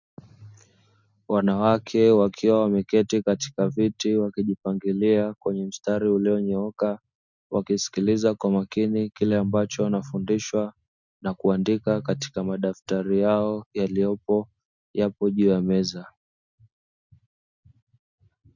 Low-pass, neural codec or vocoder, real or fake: 7.2 kHz; none; real